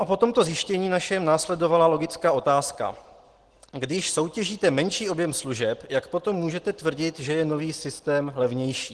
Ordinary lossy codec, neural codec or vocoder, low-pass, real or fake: Opus, 16 kbps; none; 10.8 kHz; real